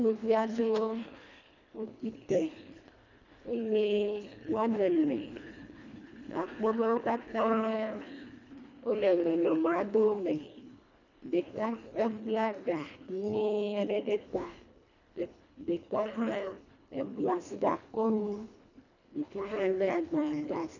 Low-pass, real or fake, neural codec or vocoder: 7.2 kHz; fake; codec, 24 kHz, 1.5 kbps, HILCodec